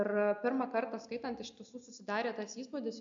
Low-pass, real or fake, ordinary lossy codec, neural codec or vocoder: 7.2 kHz; real; MP3, 64 kbps; none